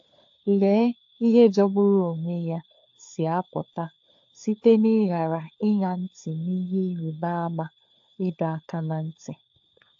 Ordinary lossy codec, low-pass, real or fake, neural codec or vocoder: AAC, 48 kbps; 7.2 kHz; fake; codec, 16 kHz, 4 kbps, FunCodec, trained on LibriTTS, 50 frames a second